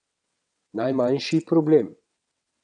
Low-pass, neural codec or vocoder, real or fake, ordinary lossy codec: 9.9 kHz; vocoder, 22.05 kHz, 80 mel bands, WaveNeXt; fake; none